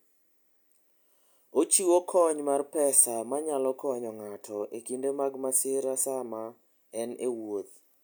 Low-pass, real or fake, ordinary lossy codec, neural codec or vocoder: none; real; none; none